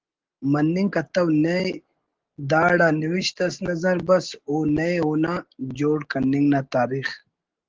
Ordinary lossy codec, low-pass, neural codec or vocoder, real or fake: Opus, 16 kbps; 7.2 kHz; none; real